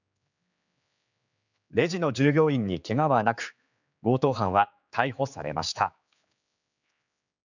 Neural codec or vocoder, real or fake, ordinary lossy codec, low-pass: codec, 16 kHz, 4 kbps, X-Codec, HuBERT features, trained on general audio; fake; none; 7.2 kHz